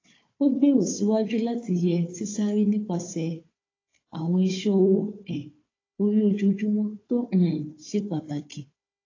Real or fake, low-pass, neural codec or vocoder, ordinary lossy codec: fake; 7.2 kHz; codec, 16 kHz, 4 kbps, FunCodec, trained on Chinese and English, 50 frames a second; AAC, 32 kbps